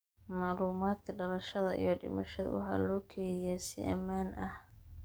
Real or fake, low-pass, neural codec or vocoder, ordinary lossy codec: fake; none; codec, 44.1 kHz, 7.8 kbps, DAC; none